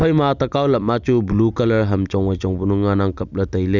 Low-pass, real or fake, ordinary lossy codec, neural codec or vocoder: 7.2 kHz; real; none; none